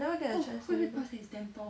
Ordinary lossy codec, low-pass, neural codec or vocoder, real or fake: none; none; none; real